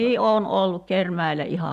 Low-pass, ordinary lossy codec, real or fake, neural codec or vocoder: 14.4 kHz; none; real; none